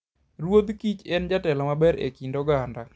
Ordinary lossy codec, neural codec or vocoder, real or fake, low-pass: none; none; real; none